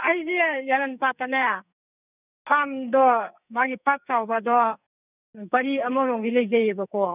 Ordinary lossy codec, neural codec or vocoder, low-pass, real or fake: none; codec, 44.1 kHz, 2.6 kbps, SNAC; 3.6 kHz; fake